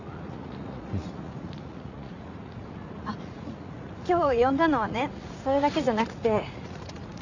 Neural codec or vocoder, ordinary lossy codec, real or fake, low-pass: vocoder, 44.1 kHz, 80 mel bands, Vocos; none; fake; 7.2 kHz